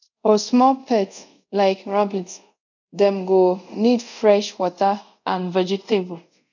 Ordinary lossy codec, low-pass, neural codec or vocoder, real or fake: none; 7.2 kHz; codec, 24 kHz, 0.5 kbps, DualCodec; fake